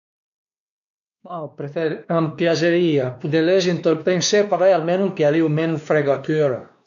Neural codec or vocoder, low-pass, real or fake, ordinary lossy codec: codec, 16 kHz, 2 kbps, X-Codec, WavLM features, trained on Multilingual LibriSpeech; 7.2 kHz; fake; MP3, 96 kbps